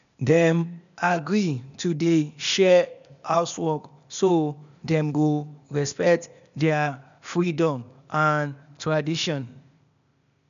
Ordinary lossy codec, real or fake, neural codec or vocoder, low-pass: none; fake; codec, 16 kHz, 0.8 kbps, ZipCodec; 7.2 kHz